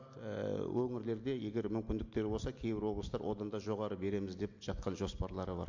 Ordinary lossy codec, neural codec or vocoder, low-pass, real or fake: MP3, 48 kbps; none; 7.2 kHz; real